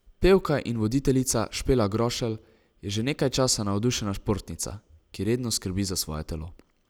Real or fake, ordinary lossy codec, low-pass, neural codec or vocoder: real; none; none; none